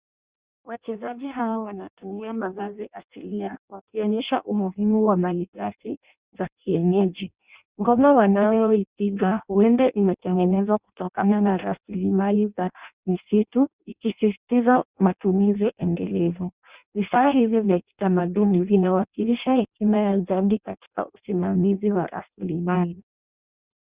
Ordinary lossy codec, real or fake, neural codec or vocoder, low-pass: Opus, 64 kbps; fake; codec, 16 kHz in and 24 kHz out, 0.6 kbps, FireRedTTS-2 codec; 3.6 kHz